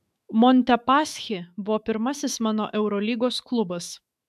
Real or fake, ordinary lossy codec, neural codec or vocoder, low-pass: fake; MP3, 96 kbps; autoencoder, 48 kHz, 128 numbers a frame, DAC-VAE, trained on Japanese speech; 14.4 kHz